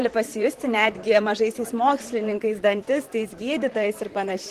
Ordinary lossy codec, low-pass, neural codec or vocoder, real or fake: Opus, 16 kbps; 14.4 kHz; vocoder, 44.1 kHz, 128 mel bands, Pupu-Vocoder; fake